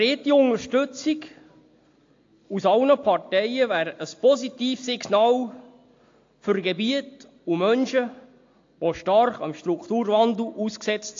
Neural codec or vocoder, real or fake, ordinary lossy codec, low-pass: none; real; AAC, 48 kbps; 7.2 kHz